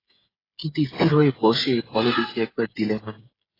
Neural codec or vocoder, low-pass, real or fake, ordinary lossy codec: codec, 16 kHz, 8 kbps, FreqCodec, smaller model; 5.4 kHz; fake; AAC, 24 kbps